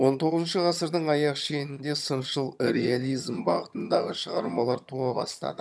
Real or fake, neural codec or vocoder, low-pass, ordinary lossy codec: fake; vocoder, 22.05 kHz, 80 mel bands, HiFi-GAN; none; none